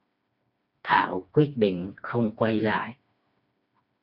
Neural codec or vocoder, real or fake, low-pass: codec, 16 kHz, 2 kbps, FreqCodec, smaller model; fake; 5.4 kHz